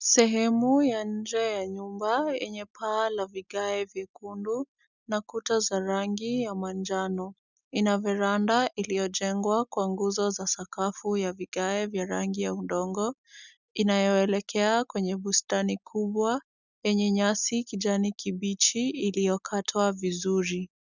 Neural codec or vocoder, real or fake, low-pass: none; real; 7.2 kHz